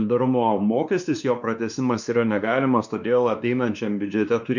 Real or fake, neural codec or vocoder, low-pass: fake; codec, 16 kHz, 2 kbps, X-Codec, WavLM features, trained on Multilingual LibriSpeech; 7.2 kHz